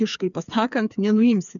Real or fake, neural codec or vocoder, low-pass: fake; codec, 16 kHz, 4 kbps, FreqCodec, smaller model; 7.2 kHz